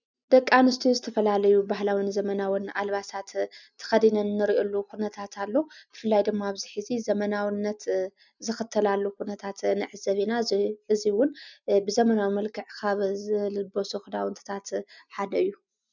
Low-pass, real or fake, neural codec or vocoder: 7.2 kHz; real; none